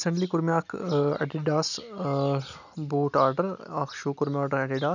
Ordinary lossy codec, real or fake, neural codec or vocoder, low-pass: none; real; none; 7.2 kHz